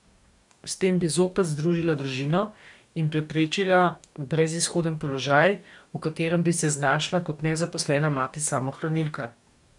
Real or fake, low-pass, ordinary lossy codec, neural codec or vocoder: fake; 10.8 kHz; none; codec, 44.1 kHz, 2.6 kbps, DAC